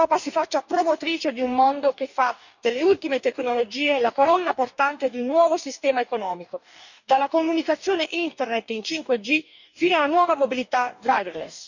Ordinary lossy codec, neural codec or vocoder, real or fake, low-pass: none; codec, 44.1 kHz, 2.6 kbps, DAC; fake; 7.2 kHz